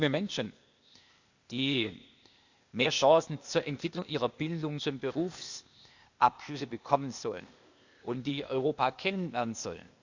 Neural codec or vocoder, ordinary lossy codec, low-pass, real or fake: codec, 16 kHz, 0.8 kbps, ZipCodec; Opus, 64 kbps; 7.2 kHz; fake